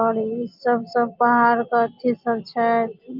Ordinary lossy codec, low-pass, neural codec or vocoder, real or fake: Opus, 32 kbps; 5.4 kHz; none; real